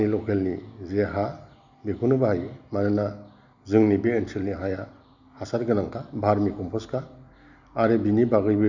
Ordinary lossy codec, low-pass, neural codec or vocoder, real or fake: none; 7.2 kHz; none; real